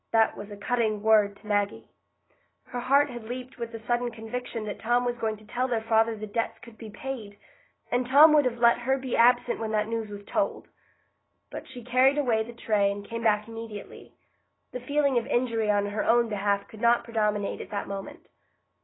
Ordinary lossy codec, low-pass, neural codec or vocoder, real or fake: AAC, 16 kbps; 7.2 kHz; none; real